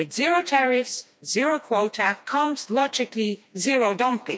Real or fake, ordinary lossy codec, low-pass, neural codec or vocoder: fake; none; none; codec, 16 kHz, 2 kbps, FreqCodec, smaller model